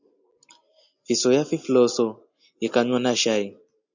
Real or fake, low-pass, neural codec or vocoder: real; 7.2 kHz; none